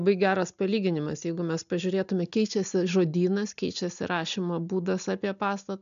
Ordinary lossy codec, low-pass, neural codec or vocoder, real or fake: AAC, 96 kbps; 7.2 kHz; none; real